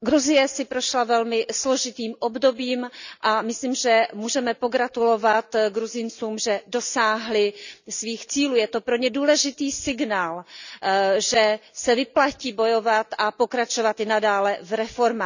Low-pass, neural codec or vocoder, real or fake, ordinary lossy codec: 7.2 kHz; none; real; none